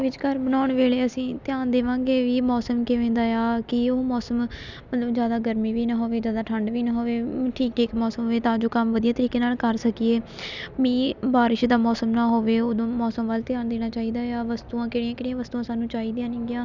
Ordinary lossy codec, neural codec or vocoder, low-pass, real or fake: none; none; 7.2 kHz; real